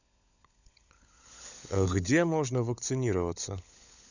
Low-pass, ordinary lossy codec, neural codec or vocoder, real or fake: 7.2 kHz; none; codec, 16 kHz, 16 kbps, FunCodec, trained on LibriTTS, 50 frames a second; fake